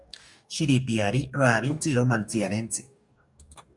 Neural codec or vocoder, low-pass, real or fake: codec, 44.1 kHz, 2.6 kbps, DAC; 10.8 kHz; fake